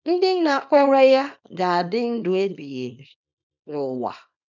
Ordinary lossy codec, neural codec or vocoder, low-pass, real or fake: none; codec, 24 kHz, 0.9 kbps, WavTokenizer, small release; 7.2 kHz; fake